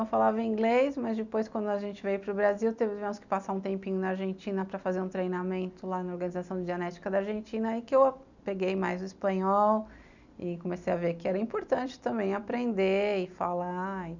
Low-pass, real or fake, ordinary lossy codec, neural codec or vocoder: 7.2 kHz; real; none; none